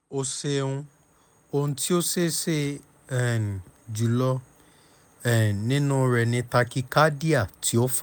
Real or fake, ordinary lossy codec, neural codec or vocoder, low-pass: real; none; none; none